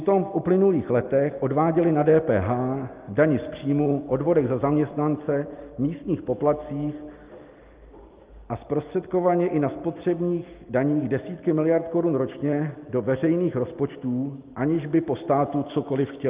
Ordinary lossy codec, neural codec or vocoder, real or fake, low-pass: Opus, 32 kbps; none; real; 3.6 kHz